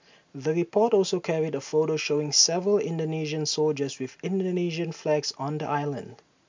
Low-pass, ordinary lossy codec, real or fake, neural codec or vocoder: 7.2 kHz; MP3, 64 kbps; real; none